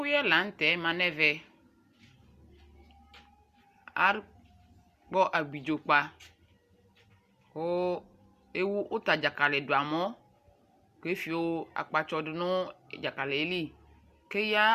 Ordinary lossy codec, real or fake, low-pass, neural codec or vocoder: Opus, 64 kbps; real; 14.4 kHz; none